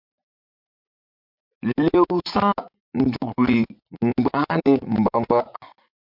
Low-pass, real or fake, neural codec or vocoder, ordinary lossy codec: 5.4 kHz; fake; vocoder, 44.1 kHz, 80 mel bands, Vocos; MP3, 48 kbps